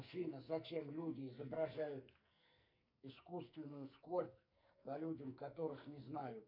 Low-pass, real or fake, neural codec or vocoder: 5.4 kHz; fake; codec, 44.1 kHz, 2.6 kbps, SNAC